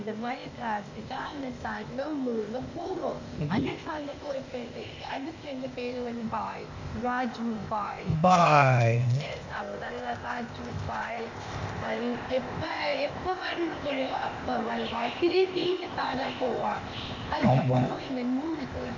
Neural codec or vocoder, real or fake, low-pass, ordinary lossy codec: codec, 16 kHz, 0.8 kbps, ZipCodec; fake; 7.2 kHz; MP3, 48 kbps